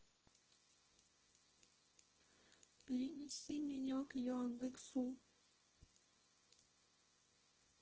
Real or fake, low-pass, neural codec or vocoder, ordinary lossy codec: fake; 7.2 kHz; codec, 24 kHz, 0.9 kbps, WavTokenizer, small release; Opus, 16 kbps